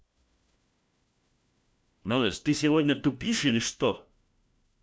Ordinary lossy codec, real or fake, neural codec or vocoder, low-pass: none; fake; codec, 16 kHz, 1 kbps, FunCodec, trained on LibriTTS, 50 frames a second; none